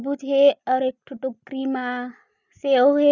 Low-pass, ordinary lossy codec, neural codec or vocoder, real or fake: 7.2 kHz; none; none; real